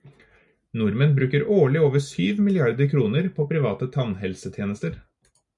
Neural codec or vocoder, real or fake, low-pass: none; real; 10.8 kHz